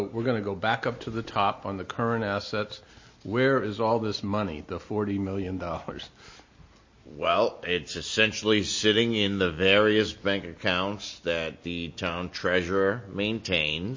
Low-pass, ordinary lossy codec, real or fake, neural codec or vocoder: 7.2 kHz; MP3, 32 kbps; real; none